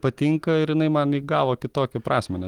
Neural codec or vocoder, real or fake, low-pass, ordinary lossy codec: none; real; 19.8 kHz; Opus, 24 kbps